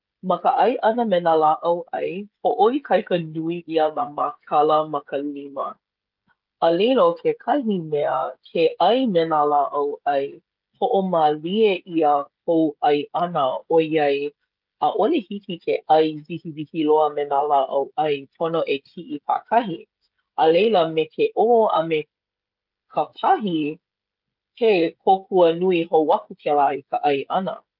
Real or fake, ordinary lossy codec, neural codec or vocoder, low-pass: fake; Opus, 24 kbps; codec, 16 kHz, 16 kbps, FreqCodec, smaller model; 5.4 kHz